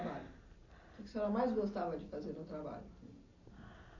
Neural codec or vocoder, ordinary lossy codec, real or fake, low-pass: none; AAC, 48 kbps; real; 7.2 kHz